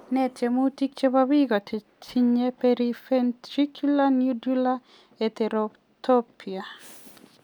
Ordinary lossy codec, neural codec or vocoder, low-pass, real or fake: none; none; none; real